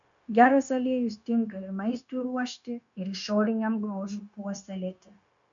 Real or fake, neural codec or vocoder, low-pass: fake; codec, 16 kHz, 0.9 kbps, LongCat-Audio-Codec; 7.2 kHz